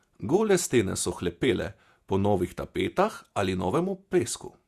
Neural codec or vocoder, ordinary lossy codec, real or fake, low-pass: vocoder, 48 kHz, 128 mel bands, Vocos; Opus, 64 kbps; fake; 14.4 kHz